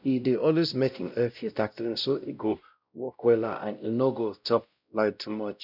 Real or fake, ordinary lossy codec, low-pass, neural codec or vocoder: fake; none; 5.4 kHz; codec, 16 kHz, 0.5 kbps, X-Codec, WavLM features, trained on Multilingual LibriSpeech